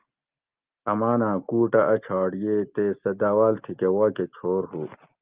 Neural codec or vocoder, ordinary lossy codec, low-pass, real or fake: none; Opus, 32 kbps; 3.6 kHz; real